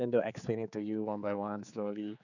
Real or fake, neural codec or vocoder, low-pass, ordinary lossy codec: fake; codec, 16 kHz, 4 kbps, X-Codec, HuBERT features, trained on general audio; 7.2 kHz; none